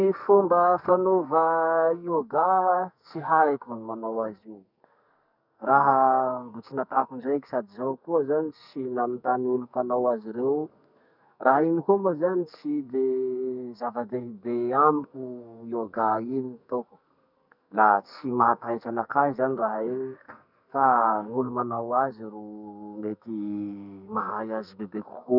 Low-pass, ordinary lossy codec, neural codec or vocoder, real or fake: 5.4 kHz; none; codec, 44.1 kHz, 2.6 kbps, SNAC; fake